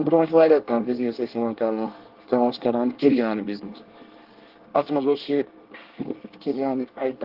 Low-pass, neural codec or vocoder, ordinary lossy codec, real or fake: 5.4 kHz; codec, 24 kHz, 1 kbps, SNAC; Opus, 16 kbps; fake